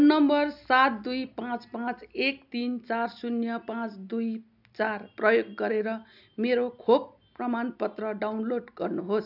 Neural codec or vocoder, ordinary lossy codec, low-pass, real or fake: none; none; 5.4 kHz; real